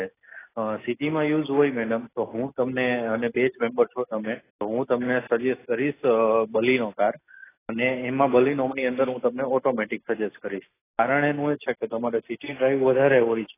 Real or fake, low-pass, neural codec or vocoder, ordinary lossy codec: real; 3.6 kHz; none; AAC, 16 kbps